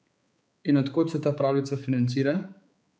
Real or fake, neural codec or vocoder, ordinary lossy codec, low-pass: fake; codec, 16 kHz, 4 kbps, X-Codec, HuBERT features, trained on balanced general audio; none; none